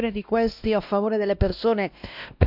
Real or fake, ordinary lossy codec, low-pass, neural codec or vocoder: fake; none; 5.4 kHz; codec, 16 kHz, 1 kbps, X-Codec, WavLM features, trained on Multilingual LibriSpeech